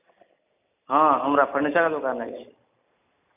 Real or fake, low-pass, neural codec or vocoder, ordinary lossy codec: real; 3.6 kHz; none; none